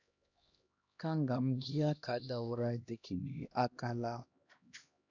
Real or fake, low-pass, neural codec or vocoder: fake; 7.2 kHz; codec, 16 kHz, 1 kbps, X-Codec, HuBERT features, trained on LibriSpeech